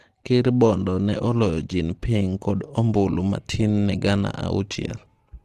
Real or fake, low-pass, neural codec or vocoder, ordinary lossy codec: real; 14.4 kHz; none; Opus, 16 kbps